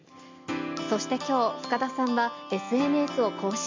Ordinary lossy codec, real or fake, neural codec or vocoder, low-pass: MP3, 48 kbps; real; none; 7.2 kHz